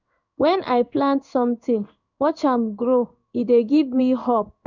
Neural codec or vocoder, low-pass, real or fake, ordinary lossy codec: codec, 16 kHz in and 24 kHz out, 1 kbps, XY-Tokenizer; 7.2 kHz; fake; none